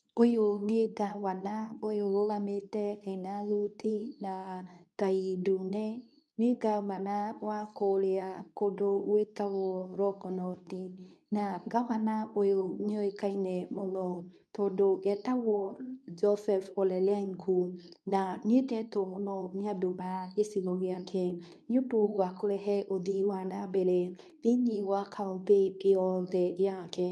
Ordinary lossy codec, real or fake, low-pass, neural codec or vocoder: none; fake; none; codec, 24 kHz, 0.9 kbps, WavTokenizer, medium speech release version 2